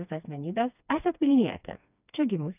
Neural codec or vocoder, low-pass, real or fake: codec, 16 kHz, 2 kbps, FreqCodec, smaller model; 3.6 kHz; fake